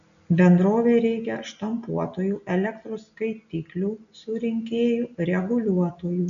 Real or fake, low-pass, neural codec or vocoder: real; 7.2 kHz; none